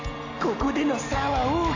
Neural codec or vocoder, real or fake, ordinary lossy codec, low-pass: none; real; AAC, 48 kbps; 7.2 kHz